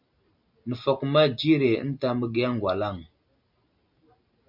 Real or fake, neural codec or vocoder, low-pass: real; none; 5.4 kHz